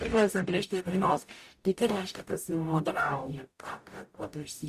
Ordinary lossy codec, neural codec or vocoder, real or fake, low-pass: Opus, 64 kbps; codec, 44.1 kHz, 0.9 kbps, DAC; fake; 14.4 kHz